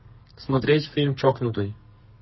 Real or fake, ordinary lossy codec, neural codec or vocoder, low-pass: fake; MP3, 24 kbps; codec, 32 kHz, 1.9 kbps, SNAC; 7.2 kHz